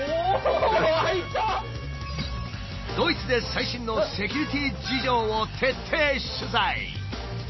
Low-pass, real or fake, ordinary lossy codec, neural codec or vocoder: 7.2 kHz; real; MP3, 24 kbps; none